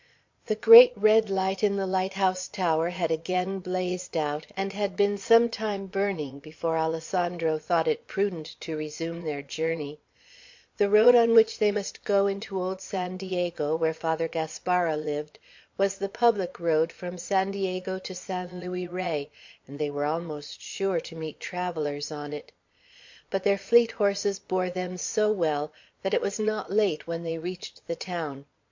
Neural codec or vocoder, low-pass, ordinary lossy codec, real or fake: vocoder, 22.05 kHz, 80 mel bands, Vocos; 7.2 kHz; MP3, 48 kbps; fake